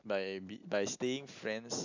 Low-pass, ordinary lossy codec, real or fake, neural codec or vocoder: 7.2 kHz; AAC, 48 kbps; real; none